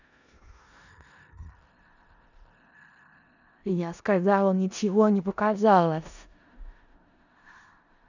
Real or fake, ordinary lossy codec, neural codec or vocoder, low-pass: fake; none; codec, 16 kHz in and 24 kHz out, 0.4 kbps, LongCat-Audio-Codec, four codebook decoder; 7.2 kHz